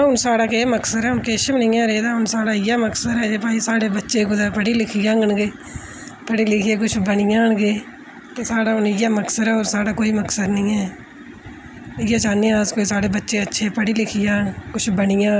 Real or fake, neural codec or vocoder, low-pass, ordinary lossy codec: real; none; none; none